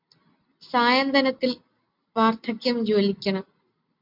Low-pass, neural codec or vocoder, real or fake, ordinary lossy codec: 5.4 kHz; none; real; MP3, 48 kbps